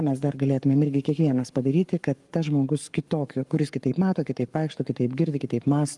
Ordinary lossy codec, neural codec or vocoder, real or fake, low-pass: Opus, 32 kbps; codec, 44.1 kHz, 7.8 kbps, Pupu-Codec; fake; 10.8 kHz